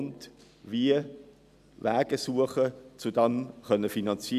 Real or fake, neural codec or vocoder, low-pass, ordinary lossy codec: real; none; 14.4 kHz; none